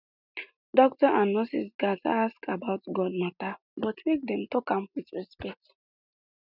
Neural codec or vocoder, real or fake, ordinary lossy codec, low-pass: none; real; none; 5.4 kHz